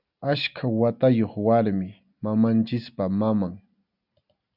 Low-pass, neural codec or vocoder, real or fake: 5.4 kHz; none; real